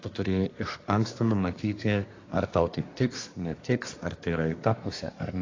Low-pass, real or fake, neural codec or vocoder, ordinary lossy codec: 7.2 kHz; fake; codec, 24 kHz, 1 kbps, SNAC; AAC, 32 kbps